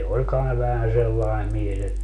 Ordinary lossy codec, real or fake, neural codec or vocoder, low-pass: none; real; none; 10.8 kHz